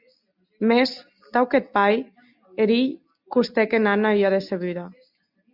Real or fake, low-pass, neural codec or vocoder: real; 5.4 kHz; none